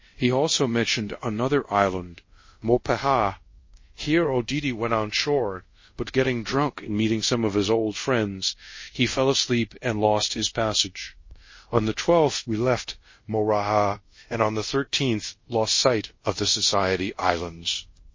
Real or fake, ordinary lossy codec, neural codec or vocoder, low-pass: fake; MP3, 32 kbps; codec, 24 kHz, 0.5 kbps, DualCodec; 7.2 kHz